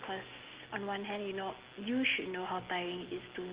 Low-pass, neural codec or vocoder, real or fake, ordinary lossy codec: 3.6 kHz; none; real; Opus, 16 kbps